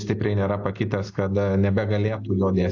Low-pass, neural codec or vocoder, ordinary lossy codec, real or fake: 7.2 kHz; none; AAC, 48 kbps; real